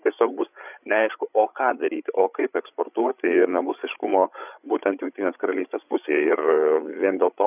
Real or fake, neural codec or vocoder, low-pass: fake; codec, 16 kHz, 8 kbps, FreqCodec, larger model; 3.6 kHz